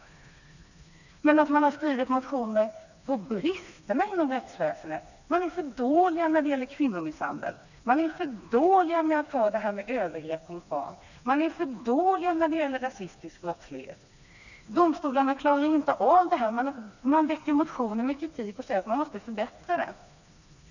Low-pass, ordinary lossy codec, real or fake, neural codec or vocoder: 7.2 kHz; none; fake; codec, 16 kHz, 2 kbps, FreqCodec, smaller model